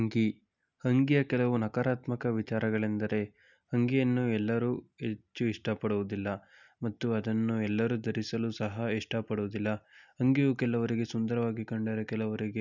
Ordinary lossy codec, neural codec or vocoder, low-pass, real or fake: none; none; 7.2 kHz; real